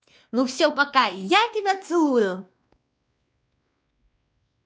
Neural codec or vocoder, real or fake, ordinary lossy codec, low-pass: codec, 16 kHz, 2 kbps, X-Codec, WavLM features, trained on Multilingual LibriSpeech; fake; none; none